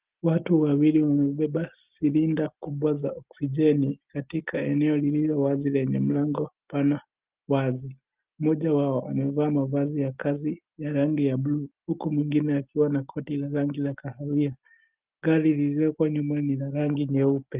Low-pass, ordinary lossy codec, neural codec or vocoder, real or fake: 3.6 kHz; Opus, 32 kbps; none; real